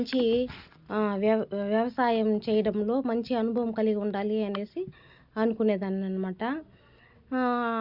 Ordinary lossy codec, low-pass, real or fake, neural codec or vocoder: Opus, 64 kbps; 5.4 kHz; real; none